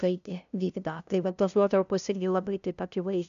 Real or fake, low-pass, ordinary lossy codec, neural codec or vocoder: fake; 7.2 kHz; AAC, 96 kbps; codec, 16 kHz, 0.5 kbps, FunCodec, trained on LibriTTS, 25 frames a second